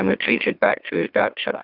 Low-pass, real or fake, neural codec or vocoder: 5.4 kHz; fake; autoencoder, 44.1 kHz, a latent of 192 numbers a frame, MeloTTS